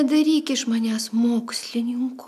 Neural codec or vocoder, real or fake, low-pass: none; real; 14.4 kHz